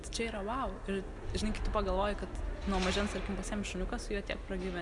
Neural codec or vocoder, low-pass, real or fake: none; 10.8 kHz; real